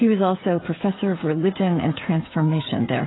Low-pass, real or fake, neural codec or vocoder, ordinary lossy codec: 7.2 kHz; fake; codec, 16 kHz, 16 kbps, FreqCodec, smaller model; AAC, 16 kbps